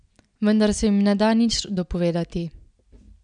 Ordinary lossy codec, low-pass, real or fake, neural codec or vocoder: none; 9.9 kHz; real; none